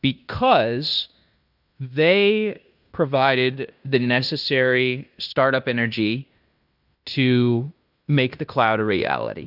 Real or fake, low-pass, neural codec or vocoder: fake; 5.4 kHz; codec, 16 kHz in and 24 kHz out, 0.9 kbps, LongCat-Audio-Codec, fine tuned four codebook decoder